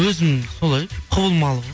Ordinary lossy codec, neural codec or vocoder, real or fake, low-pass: none; none; real; none